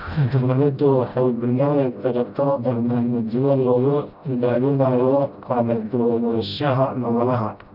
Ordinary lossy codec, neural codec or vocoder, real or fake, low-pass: none; codec, 16 kHz, 0.5 kbps, FreqCodec, smaller model; fake; 5.4 kHz